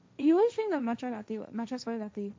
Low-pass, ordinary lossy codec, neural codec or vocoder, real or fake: none; none; codec, 16 kHz, 1.1 kbps, Voila-Tokenizer; fake